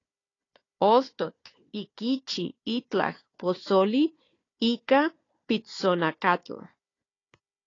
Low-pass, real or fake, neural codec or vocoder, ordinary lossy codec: 7.2 kHz; fake; codec, 16 kHz, 4 kbps, FunCodec, trained on Chinese and English, 50 frames a second; AAC, 32 kbps